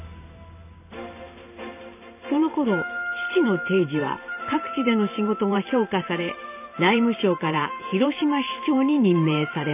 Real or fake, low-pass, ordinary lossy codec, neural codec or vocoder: fake; 3.6 kHz; none; vocoder, 44.1 kHz, 128 mel bands every 512 samples, BigVGAN v2